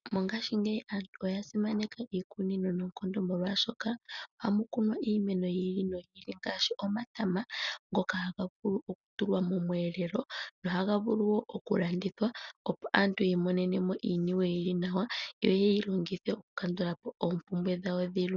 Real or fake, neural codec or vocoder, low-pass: real; none; 7.2 kHz